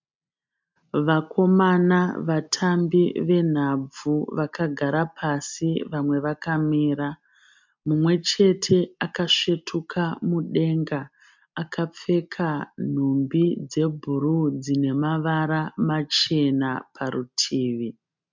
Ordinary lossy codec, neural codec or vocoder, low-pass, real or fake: MP3, 64 kbps; none; 7.2 kHz; real